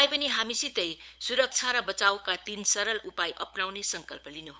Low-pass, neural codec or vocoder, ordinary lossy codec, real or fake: none; codec, 16 kHz, 8 kbps, FunCodec, trained on LibriTTS, 25 frames a second; none; fake